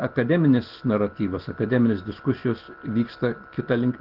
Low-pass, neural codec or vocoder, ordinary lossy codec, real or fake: 5.4 kHz; none; Opus, 16 kbps; real